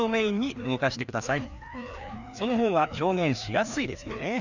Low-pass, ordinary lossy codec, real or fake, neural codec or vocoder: 7.2 kHz; none; fake; codec, 16 kHz, 2 kbps, FreqCodec, larger model